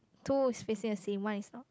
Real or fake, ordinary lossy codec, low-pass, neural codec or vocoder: real; none; none; none